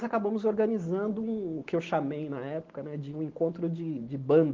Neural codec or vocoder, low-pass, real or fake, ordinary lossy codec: none; 7.2 kHz; real; Opus, 16 kbps